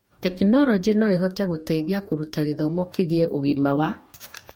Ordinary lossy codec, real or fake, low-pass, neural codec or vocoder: MP3, 64 kbps; fake; 19.8 kHz; codec, 44.1 kHz, 2.6 kbps, DAC